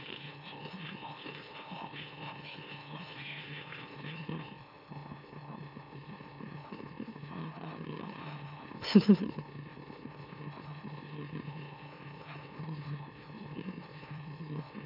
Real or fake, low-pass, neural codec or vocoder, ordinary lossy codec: fake; 5.4 kHz; autoencoder, 44.1 kHz, a latent of 192 numbers a frame, MeloTTS; none